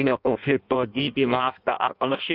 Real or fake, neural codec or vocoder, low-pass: fake; codec, 16 kHz in and 24 kHz out, 0.6 kbps, FireRedTTS-2 codec; 5.4 kHz